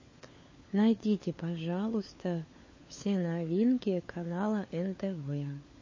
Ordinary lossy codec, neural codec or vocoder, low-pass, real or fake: MP3, 32 kbps; codec, 16 kHz, 4 kbps, FunCodec, trained on LibriTTS, 50 frames a second; 7.2 kHz; fake